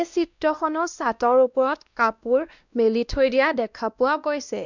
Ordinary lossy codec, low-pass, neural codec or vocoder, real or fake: none; 7.2 kHz; codec, 16 kHz, 1 kbps, X-Codec, WavLM features, trained on Multilingual LibriSpeech; fake